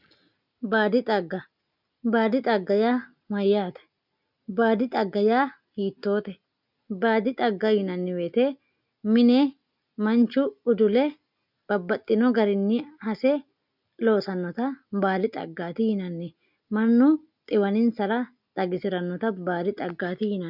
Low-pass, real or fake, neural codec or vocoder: 5.4 kHz; real; none